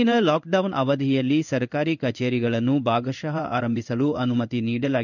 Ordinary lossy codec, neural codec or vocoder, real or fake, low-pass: none; codec, 16 kHz in and 24 kHz out, 1 kbps, XY-Tokenizer; fake; 7.2 kHz